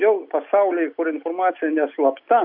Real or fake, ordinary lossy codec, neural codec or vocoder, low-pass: real; MP3, 48 kbps; none; 5.4 kHz